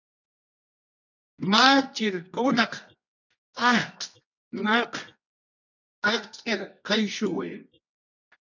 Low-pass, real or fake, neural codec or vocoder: 7.2 kHz; fake; codec, 24 kHz, 0.9 kbps, WavTokenizer, medium music audio release